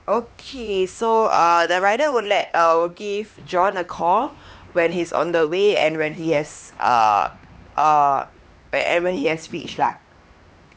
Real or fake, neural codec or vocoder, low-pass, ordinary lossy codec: fake; codec, 16 kHz, 2 kbps, X-Codec, HuBERT features, trained on LibriSpeech; none; none